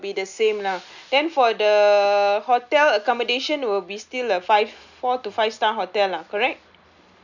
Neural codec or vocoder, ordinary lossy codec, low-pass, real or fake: none; none; 7.2 kHz; real